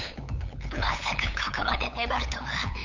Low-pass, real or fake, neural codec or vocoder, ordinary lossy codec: 7.2 kHz; fake; codec, 16 kHz, 8 kbps, FunCodec, trained on LibriTTS, 25 frames a second; none